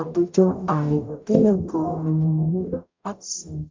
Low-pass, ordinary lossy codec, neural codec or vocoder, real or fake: 7.2 kHz; MP3, 64 kbps; codec, 44.1 kHz, 0.9 kbps, DAC; fake